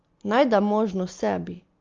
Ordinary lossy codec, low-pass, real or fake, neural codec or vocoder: Opus, 32 kbps; 7.2 kHz; real; none